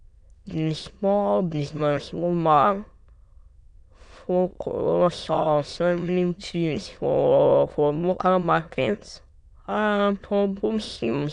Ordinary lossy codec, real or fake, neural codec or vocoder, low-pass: none; fake; autoencoder, 22.05 kHz, a latent of 192 numbers a frame, VITS, trained on many speakers; 9.9 kHz